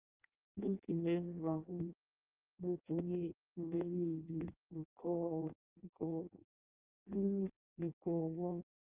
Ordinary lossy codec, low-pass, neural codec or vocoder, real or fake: Opus, 32 kbps; 3.6 kHz; codec, 16 kHz in and 24 kHz out, 0.6 kbps, FireRedTTS-2 codec; fake